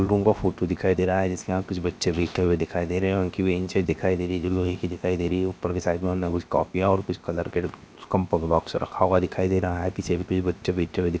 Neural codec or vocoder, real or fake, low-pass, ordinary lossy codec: codec, 16 kHz, 0.7 kbps, FocalCodec; fake; none; none